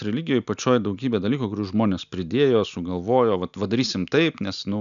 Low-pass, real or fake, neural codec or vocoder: 7.2 kHz; real; none